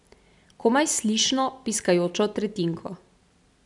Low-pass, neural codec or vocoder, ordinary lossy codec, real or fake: 10.8 kHz; none; none; real